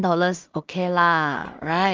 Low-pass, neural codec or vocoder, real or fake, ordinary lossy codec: 7.2 kHz; codec, 16 kHz in and 24 kHz out, 0.4 kbps, LongCat-Audio-Codec, two codebook decoder; fake; Opus, 24 kbps